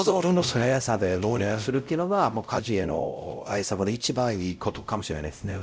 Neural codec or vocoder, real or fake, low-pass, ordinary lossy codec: codec, 16 kHz, 0.5 kbps, X-Codec, WavLM features, trained on Multilingual LibriSpeech; fake; none; none